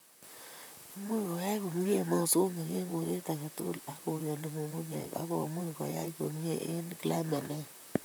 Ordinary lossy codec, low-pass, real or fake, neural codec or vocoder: none; none; fake; vocoder, 44.1 kHz, 128 mel bands, Pupu-Vocoder